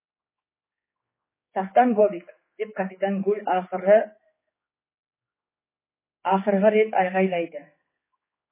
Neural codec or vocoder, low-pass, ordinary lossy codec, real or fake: codec, 16 kHz, 4 kbps, X-Codec, HuBERT features, trained on general audio; 3.6 kHz; MP3, 16 kbps; fake